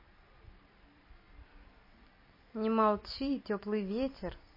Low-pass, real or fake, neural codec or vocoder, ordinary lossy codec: 5.4 kHz; fake; vocoder, 44.1 kHz, 128 mel bands every 256 samples, BigVGAN v2; MP3, 24 kbps